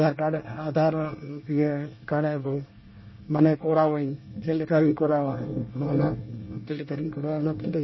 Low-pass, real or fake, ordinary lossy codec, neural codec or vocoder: 7.2 kHz; fake; MP3, 24 kbps; codec, 24 kHz, 1 kbps, SNAC